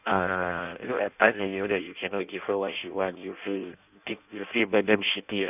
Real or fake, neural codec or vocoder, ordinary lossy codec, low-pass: fake; codec, 16 kHz in and 24 kHz out, 0.6 kbps, FireRedTTS-2 codec; none; 3.6 kHz